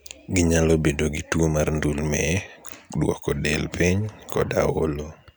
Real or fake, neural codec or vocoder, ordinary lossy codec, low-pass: real; none; none; none